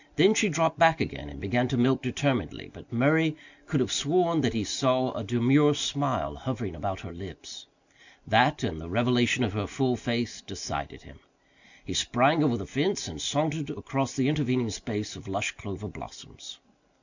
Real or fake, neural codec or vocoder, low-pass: real; none; 7.2 kHz